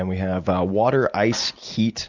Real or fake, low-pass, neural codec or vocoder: real; 7.2 kHz; none